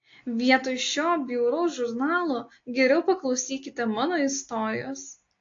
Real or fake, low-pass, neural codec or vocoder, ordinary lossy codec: real; 7.2 kHz; none; AAC, 48 kbps